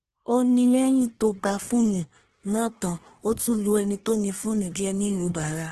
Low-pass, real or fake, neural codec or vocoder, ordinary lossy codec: 10.8 kHz; fake; codec, 24 kHz, 1 kbps, SNAC; Opus, 16 kbps